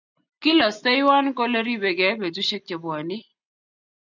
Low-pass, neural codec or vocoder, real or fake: 7.2 kHz; none; real